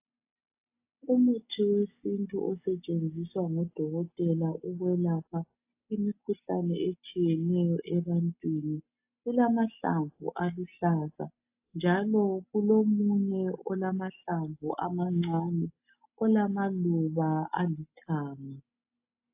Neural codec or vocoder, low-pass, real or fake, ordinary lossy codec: none; 3.6 kHz; real; AAC, 32 kbps